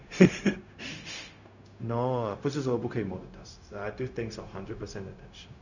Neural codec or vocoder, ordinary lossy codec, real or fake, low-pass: codec, 16 kHz, 0.4 kbps, LongCat-Audio-Codec; none; fake; 7.2 kHz